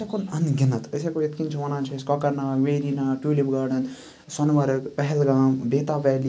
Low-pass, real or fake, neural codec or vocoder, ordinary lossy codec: none; real; none; none